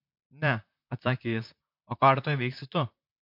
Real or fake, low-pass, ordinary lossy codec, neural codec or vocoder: fake; 5.4 kHz; MP3, 48 kbps; vocoder, 44.1 kHz, 128 mel bands, Pupu-Vocoder